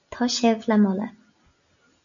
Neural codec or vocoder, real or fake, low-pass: none; real; 7.2 kHz